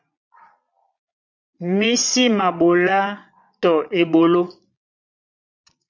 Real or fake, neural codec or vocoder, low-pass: fake; vocoder, 22.05 kHz, 80 mel bands, Vocos; 7.2 kHz